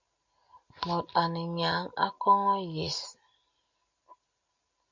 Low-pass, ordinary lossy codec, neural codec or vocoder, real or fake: 7.2 kHz; MP3, 48 kbps; none; real